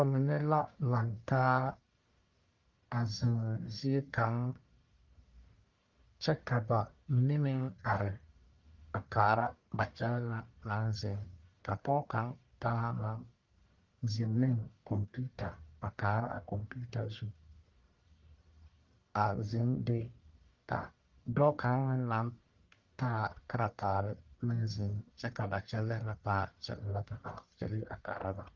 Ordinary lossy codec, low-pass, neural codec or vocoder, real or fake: Opus, 24 kbps; 7.2 kHz; codec, 44.1 kHz, 1.7 kbps, Pupu-Codec; fake